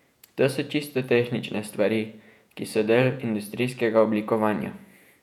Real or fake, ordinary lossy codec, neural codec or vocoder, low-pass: fake; none; vocoder, 48 kHz, 128 mel bands, Vocos; 19.8 kHz